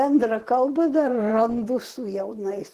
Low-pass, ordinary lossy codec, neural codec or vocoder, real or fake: 14.4 kHz; Opus, 16 kbps; vocoder, 44.1 kHz, 128 mel bands, Pupu-Vocoder; fake